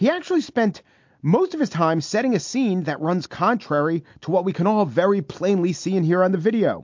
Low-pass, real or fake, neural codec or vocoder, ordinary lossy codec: 7.2 kHz; real; none; MP3, 48 kbps